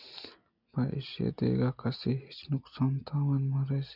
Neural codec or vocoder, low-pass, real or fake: none; 5.4 kHz; real